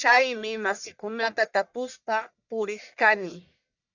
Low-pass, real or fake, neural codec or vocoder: 7.2 kHz; fake; codec, 44.1 kHz, 1.7 kbps, Pupu-Codec